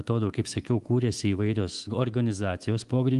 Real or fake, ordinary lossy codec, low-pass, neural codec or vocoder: fake; Opus, 24 kbps; 10.8 kHz; codec, 24 kHz, 3.1 kbps, DualCodec